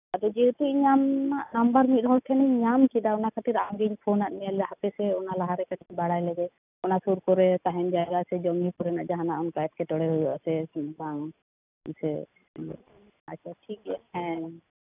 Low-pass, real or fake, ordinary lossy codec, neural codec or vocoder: 3.6 kHz; real; none; none